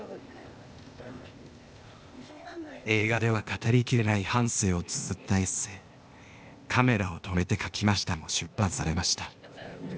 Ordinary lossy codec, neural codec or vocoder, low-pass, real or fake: none; codec, 16 kHz, 0.8 kbps, ZipCodec; none; fake